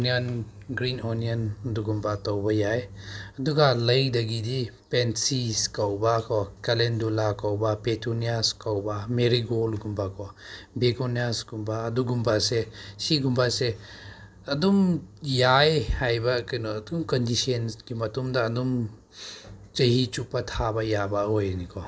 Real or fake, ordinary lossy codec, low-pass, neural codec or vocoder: real; none; none; none